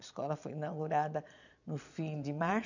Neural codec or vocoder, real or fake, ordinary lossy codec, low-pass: vocoder, 22.05 kHz, 80 mel bands, Vocos; fake; none; 7.2 kHz